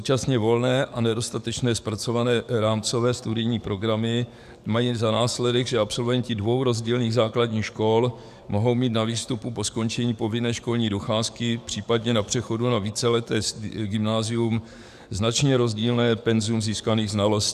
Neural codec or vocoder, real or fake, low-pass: codec, 44.1 kHz, 7.8 kbps, DAC; fake; 14.4 kHz